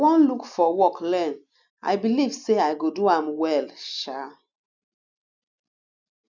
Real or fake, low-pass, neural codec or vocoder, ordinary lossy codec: real; 7.2 kHz; none; none